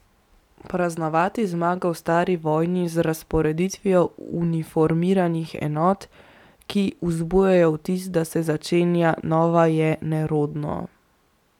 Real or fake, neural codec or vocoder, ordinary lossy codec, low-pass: real; none; none; 19.8 kHz